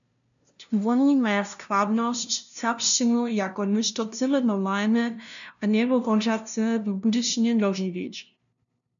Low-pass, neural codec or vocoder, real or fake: 7.2 kHz; codec, 16 kHz, 0.5 kbps, FunCodec, trained on LibriTTS, 25 frames a second; fake